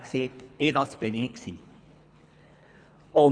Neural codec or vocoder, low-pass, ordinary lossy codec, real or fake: codec, 24 kHz, 3 kbps, HILCodec; 9.9 kHz; none; fake